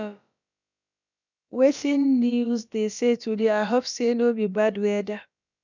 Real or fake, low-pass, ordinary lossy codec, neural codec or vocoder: fake; 7.2 kHz; none; codec, 16 kHz, about 1 kbps, DyCAST, with the encoder's durations